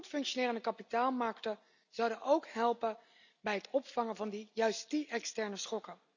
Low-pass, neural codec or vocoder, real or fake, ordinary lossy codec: 7.2 kHz; none; real; none